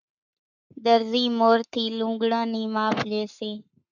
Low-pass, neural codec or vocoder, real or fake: 7.2 kHz; codec, 44.1 kHz, 7.8 kbps, Pupu-Codec; fake